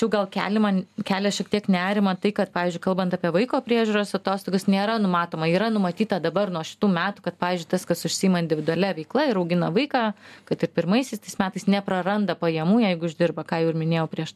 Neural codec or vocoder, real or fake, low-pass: none; real; 14.4 kHz